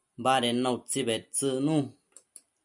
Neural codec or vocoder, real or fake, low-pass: none; real; 10.8 kHz